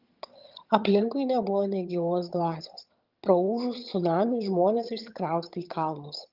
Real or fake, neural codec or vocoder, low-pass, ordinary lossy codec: fake; vocoder, 22.05 kHz, 80 mel bands, HiFi-GAN; 5.4 kHz; Opus, 32 kbps